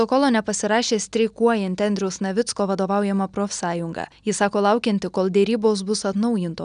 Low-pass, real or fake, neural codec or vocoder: 9.9 kHz; real; none